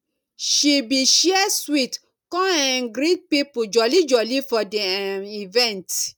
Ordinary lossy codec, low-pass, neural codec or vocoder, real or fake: none; 19.8 kHz; none; real